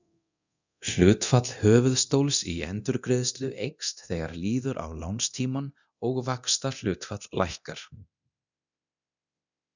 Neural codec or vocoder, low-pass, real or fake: codec, 24 kHz, 0.9 kbps, DualCodec; 7.2 kHz; fake